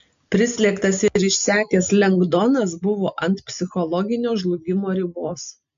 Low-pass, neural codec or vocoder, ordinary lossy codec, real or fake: 7.2 kHz; none; AAC, 64 kbps; real